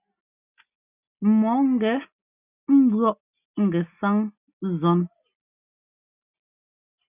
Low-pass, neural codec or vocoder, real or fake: 3.6 kHz; none; real